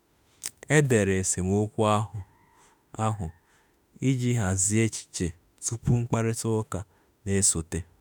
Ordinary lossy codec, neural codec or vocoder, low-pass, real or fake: none; autoencoder, 48 kHz, 32 numbers a frame, DAC-VAE, trained on Japanese speech; none; fake